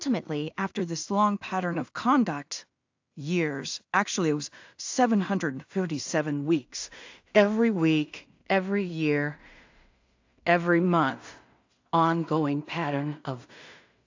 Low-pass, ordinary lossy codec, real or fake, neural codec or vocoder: 7.2 kHz; AAC, 48 kbps; fake; codec, 16 kHz in and 24 kHz out, 0.4 kbps, LongCat-Audio-Codec, two codebook decoder